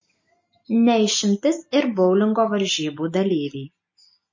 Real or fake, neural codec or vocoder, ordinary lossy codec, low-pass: real; none; MP3, 32 kbps; 7.2 kHz